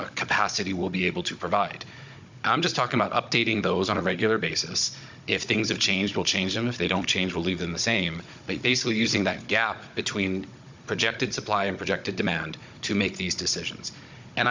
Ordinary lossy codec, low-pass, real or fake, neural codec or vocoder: MP3, 64 kbps; 7.2 kHz; fake; codec, 16 kHz, 16 kbps, FunCodec, trained on Chinese and English, 50 frames a second